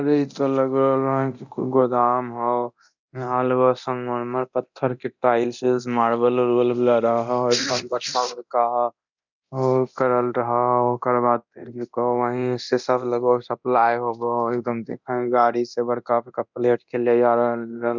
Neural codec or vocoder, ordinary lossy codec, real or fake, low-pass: codec, 24 kHz, 0.9 kbps, DualCodec; none; fake; 7.2 kHz